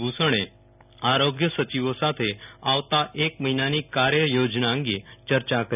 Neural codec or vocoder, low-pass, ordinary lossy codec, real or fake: none; 3.6 kHz; none; real